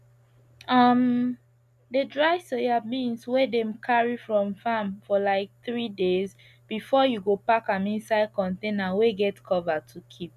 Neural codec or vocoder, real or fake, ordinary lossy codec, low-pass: none; real; none; 14.4 kHz